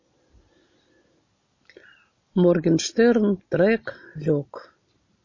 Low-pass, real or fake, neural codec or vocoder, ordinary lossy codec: 7.2 kHz; real; none; MP3, 32 kbps